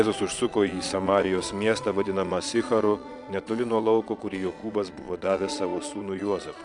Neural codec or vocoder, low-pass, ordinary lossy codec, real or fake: vocoder, 22.05 kHz, 80 mel bands, Vocos; 9.9 kHz; MP3, 96 kbps; fake